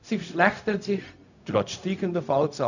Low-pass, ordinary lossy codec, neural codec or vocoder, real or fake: 7.2 kHz; none; codec, 16 kHz, 0.4 kbps, LongCat-Audio-Codec; fake